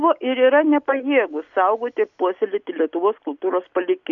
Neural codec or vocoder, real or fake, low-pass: none; real; 7.2 kHz